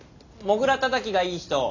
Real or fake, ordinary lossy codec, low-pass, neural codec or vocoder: real; none; 7.2 kHz; none